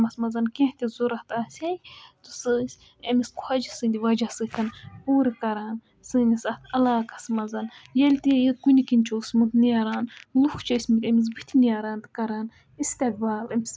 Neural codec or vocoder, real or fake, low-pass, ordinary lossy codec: none; real; none; none